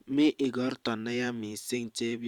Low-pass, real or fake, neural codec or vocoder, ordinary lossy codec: 19.8 kHz; fake; vocoder, 44.1 kHz, 128 mel bands every 512 samples, BigVGAN v2; Opus, 64 kbps